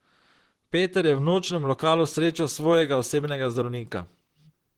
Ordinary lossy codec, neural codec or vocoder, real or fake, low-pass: Opus, 16 kbps; vocoder, 44.1 kHz, 128 mel bands, Pupu-Vocoder; fake; 19.8 kHz